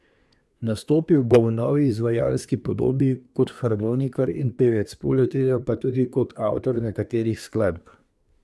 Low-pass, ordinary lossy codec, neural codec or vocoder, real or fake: none; none; codec, 24 kHz, 1 kbps, SNAC; fake